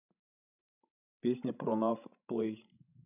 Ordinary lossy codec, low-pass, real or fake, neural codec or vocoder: none; 3.6 kHz; fake; codec, 16 kHz, 16 kbps, FreqCodec, larger model